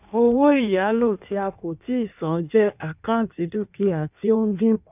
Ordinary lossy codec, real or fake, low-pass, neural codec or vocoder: none; fake; 3.6 kHz; codec, 16 kHz in and 24 kHz out, 1.1 kbps, FireRedTTS-2 codec